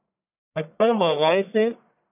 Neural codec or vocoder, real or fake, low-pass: codec, 44.1 kHz, 1.7 kbps, Pupu-Codec; fake; 3.6 kHz